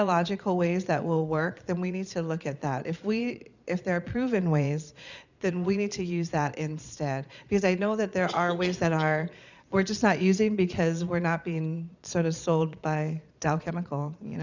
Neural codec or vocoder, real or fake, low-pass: vocoder, 22.05 kHz, 80 mel bands, Vocos; fake; 7.2 kHz